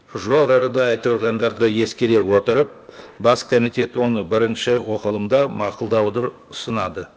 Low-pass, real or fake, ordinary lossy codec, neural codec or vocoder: none; fake; none; codec, 16 kHz, 0.8 kbps, ZipCodec